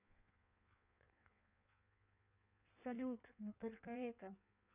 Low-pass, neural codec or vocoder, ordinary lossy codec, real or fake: 3.6 kHz; codec, 16 kHz in and 24 kHz out, 1.1 kbps, FireRedTTS-2 codec; none; fake